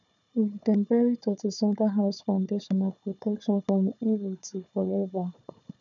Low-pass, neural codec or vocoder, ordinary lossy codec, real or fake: 7.2 kHz; codec, 16 kHz, 4 kbps, FunCodec, trained on Chinese and English, 50 frames a second; none; fake